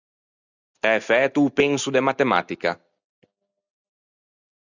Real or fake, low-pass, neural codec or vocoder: real; 7.2 kHz; none